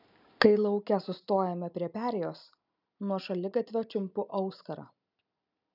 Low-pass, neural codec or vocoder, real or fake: 5.4 kHz; none; real